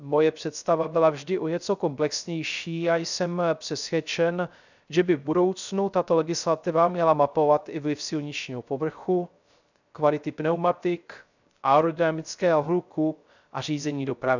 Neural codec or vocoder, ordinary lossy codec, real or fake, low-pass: codec, 16 kHz, 0.3 kbps, FocalCodec; none; fake; 7.2 kHz